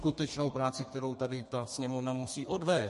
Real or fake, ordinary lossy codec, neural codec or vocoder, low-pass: fake; MP3, 48 kbps; codec, 32 kHz, 1.9 kbps, SNAC; 14.4 kHz